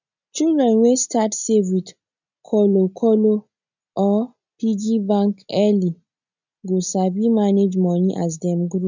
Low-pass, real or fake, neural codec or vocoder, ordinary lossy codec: 7.2 kHz; real; none; none